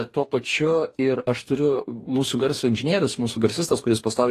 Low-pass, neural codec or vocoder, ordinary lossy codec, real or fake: 14.4 kHz; codec, 44.1 kHz, 2.6 kbps, DAC; AAC, 48 kbps; fake